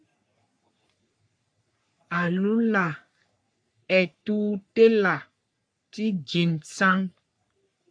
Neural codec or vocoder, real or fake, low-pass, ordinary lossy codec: codec, 44.1 kHz, 3.4 kbps, Pupu-Codec; fake; 9.9 kHz; AAC, 64 kbps